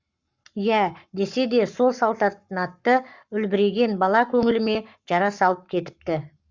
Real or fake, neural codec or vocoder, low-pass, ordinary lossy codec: fake; codec, 44.1 kHz, 7.8 kbps, Pupu-Codec; 7.2 kHz; Opus, 64 kbps